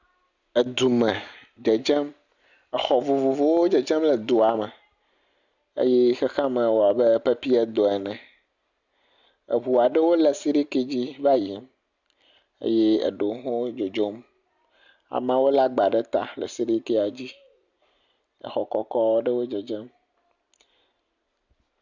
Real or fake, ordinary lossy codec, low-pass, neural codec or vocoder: real; Opus, 64 kbps; 7.2 kHz; none